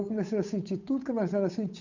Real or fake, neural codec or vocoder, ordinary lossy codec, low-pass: fake; codec, 16 kHz, 8 kbps, FunCodec, trained on Chinese and English, 25 frames a second; none; 7.2 kHz